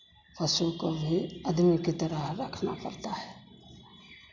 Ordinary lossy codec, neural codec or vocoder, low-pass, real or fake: none; none; 7.2 kHz; real